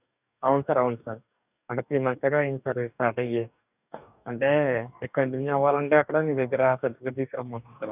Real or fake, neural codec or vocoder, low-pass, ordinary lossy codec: fake; codec, 44.1 kHz, 2.6 kbps, DAC; 3.6 kHz; none